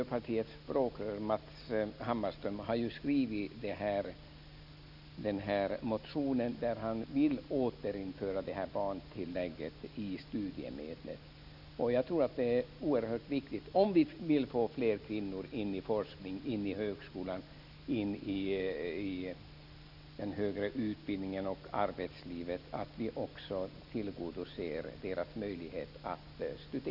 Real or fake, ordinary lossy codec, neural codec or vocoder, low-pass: real; none; none; 5.4 kHz